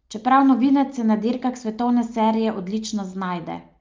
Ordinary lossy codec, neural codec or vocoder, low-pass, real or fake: Opus, 24 kbps; none; 7.2 kHz; real